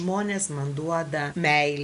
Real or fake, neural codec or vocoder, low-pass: real; none; 10.8 kHz